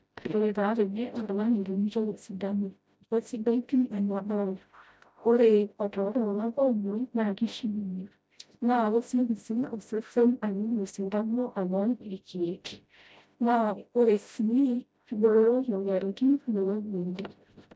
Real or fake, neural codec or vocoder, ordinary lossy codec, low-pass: fake; codec, 16 kHz, 0.5 kbps, FreqCodec, smaller model; none; none